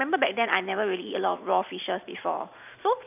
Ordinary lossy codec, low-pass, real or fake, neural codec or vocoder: none; 3.6 kHz; fake; vocoder, 44.1 kHz, 128 mel bands every 512 samples, BigVGAN v2